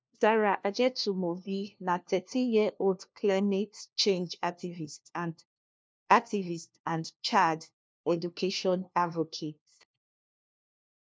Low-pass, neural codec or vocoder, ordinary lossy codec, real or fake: none; codec, 16 kHz, 1 kbps, FunCodec, trained on LibriTTS, 50 frames a second; none; fake